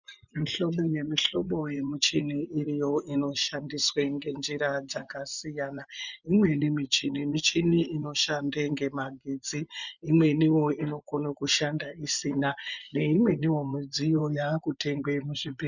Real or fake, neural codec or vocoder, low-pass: fake; vocoder, 24 kHz, 100 mel bands, Vocos; 7.2 kHz